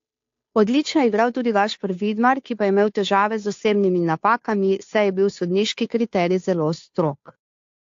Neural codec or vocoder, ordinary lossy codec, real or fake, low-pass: codec, 16 kHz, 2 kbps, FunCodec, trained on Chinese and English, 25 frames a second; AAC, 48 kbps; fake; 7.2 kHz